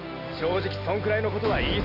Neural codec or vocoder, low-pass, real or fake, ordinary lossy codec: none; 5.4 kHz; real; Opus, 32 kbps